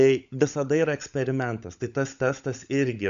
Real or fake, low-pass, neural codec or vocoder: fake; 7.2 kHz; codec, 16 kHz, 16 kbps, FunCodec, trained on LibriTTS, 50 frames a second